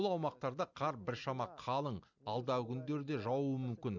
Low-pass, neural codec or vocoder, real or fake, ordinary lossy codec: 7.2 kHz; none; real; none